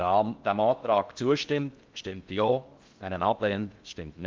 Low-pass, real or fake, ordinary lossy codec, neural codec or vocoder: 7.2 kHz; fake; Opus, 24 kbps; codec, 16 kHz in and 24 kHz out, 0.6 kbps, FocalCodec, streaming, 4096 codes